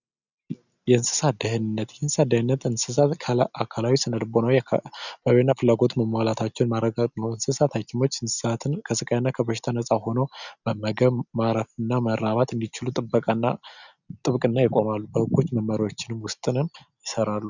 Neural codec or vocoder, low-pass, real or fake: none; 7.2 kHz; real